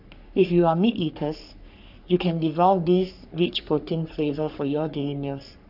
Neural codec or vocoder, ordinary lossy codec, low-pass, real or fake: codec, 44.1 kHz, 3.4 kbps, Pupu-Codec; none; 5.4 kHz; fake